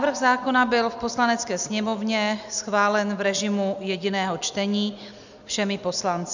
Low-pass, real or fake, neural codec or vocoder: 7.2 kHz; real; none